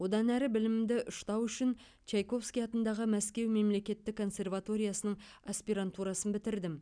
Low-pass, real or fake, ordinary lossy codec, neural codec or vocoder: 9.9 kHz; real; none; none